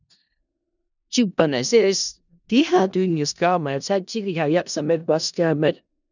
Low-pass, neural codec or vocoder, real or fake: 7.2 kHz; codec, 16 kHz in and 24 kHz out, 0.4 kbps, LongCat-Audio-Codec, four codebook decoder; fake